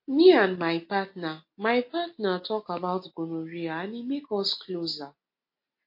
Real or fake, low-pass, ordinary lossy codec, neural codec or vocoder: real; 5.4 kHz; MP3, 32 kbps; none